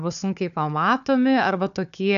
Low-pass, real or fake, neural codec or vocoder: 7.2 kHz; fake; codec, 16 kHz, 4 kbps, FunCodec, trained on Chinese and English, 50 frames a second